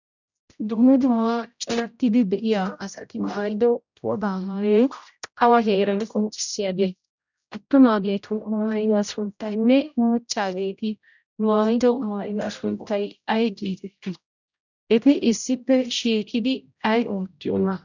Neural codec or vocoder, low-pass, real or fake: codec, 16 kHz, 0.5 kbps, X-Codec, HuBERT features, trained on general audio; 7.2 kHz; fake